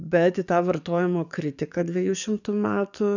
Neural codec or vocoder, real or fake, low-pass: codec, 44.1 kHz, 7.8 kbps, DAC; fake; 7.2 kHz